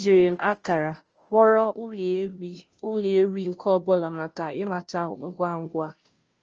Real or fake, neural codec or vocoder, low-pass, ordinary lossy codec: fake; codec, 16 kHz, 0.5 kbps, FunCodec, trained on Chinese and English, 25 frames a second; 7.2 kHz; Opus, 24 kbps